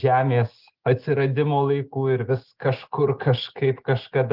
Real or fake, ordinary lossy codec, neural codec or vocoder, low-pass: real; Opus, 16 kbps; none; 5.4 kHz